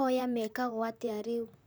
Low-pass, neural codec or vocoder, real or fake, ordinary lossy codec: none; vocoder, 44.1 kHz, 128 mel bands, Pupu-Vocoder; fake; none